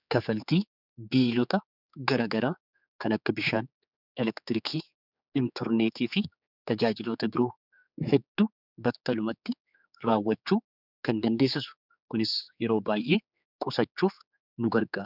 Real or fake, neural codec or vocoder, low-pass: fake; codec, 16 kHz, 4 kbps, X-Codec, HuBERT features, trained on general audio; 5.4 kHz